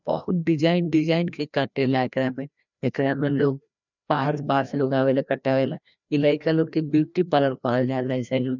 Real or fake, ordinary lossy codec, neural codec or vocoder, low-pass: fake; none; codec, 16 kHz, 1 kbps, FreqCodec, larger model; 7.2 kHz